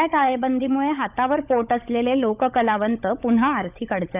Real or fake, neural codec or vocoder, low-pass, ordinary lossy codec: fake; codec, 16 kHz, 16 kbps, FunCodec, trained on LibriTTS, 50 frames a second; 3.6 kHz; none